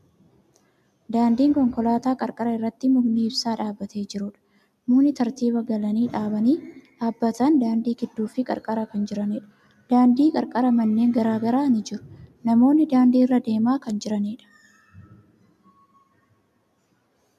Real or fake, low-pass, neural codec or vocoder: real; 14.4 kHz; none